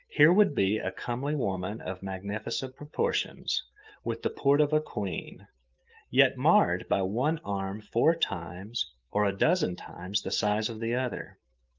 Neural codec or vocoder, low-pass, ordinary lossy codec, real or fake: autoencoder, 48 kHz, 128 numbers a frame, DAC-VAE, trained on Japanese speech; 7.2 kHz; Opus, 32 kbps; fake